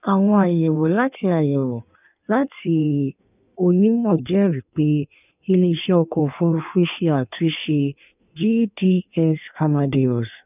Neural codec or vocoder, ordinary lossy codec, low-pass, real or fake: codec, 16 kHz in and 24 kHz out, 1.1 kbps, FireRedTTS-2 codec; none; 3.6 kHz; fake